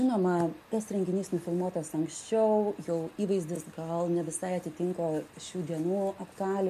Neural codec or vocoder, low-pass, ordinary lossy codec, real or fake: none; 14.4 kHz; MP3, 64 kbps; real